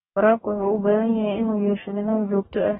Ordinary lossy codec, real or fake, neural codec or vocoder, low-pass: AAC, 16 kbps; fake; codec, 44.1 kHz, 2.6 kbps, DAC; 19.8 kHz